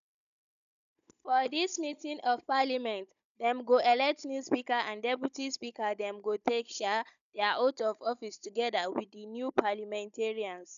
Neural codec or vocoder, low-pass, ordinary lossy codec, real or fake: codec, 16 kHz, 16 kbps, FunCodec, trained on Chinese and English, 50 frames a second; 7.2 kHz; none; fake